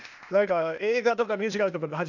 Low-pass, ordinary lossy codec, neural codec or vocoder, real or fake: 7.2 kHz; none; codec, 16 kHz, 0.8 kbps, ZipCodec; fake